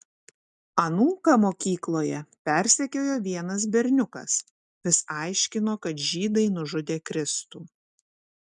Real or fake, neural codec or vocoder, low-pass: real; none; 10.8 kHz